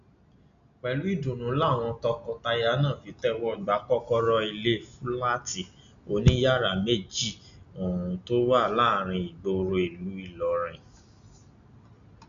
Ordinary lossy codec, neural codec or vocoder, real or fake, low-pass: none; none; real; 7.2 kHz